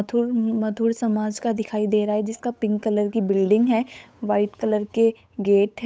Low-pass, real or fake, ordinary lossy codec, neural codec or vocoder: none; fake; none; codec, 16 kHz, 8 kbps, FunCodec, trained on Chinese and English, 25 frames a second